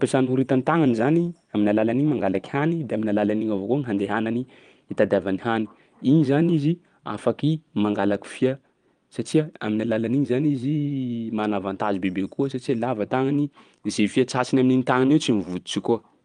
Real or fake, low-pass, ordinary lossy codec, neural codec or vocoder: fake; 9.9 kHz; Opus, 32 kbps; vocoder, 22.05 kHz, 80 mel bands, Vocos